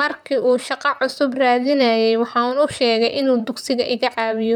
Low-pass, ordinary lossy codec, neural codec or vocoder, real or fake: 19.8 kHz; none; vocoder, 44.1 kHz, 128 mel bands, Pupu-Vocoder; fake